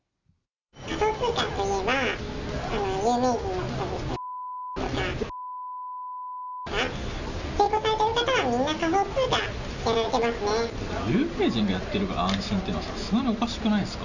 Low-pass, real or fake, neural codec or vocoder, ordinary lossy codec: 7.2 kHz; real; none; none